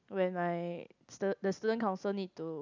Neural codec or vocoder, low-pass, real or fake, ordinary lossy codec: none; 7.2 kHz; real; none